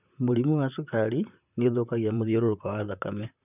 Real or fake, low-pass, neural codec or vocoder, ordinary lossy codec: fake; 3.6 kHz; vocoder, 44.1 kHz, 128 mel bands, Pupu-Vocoder; none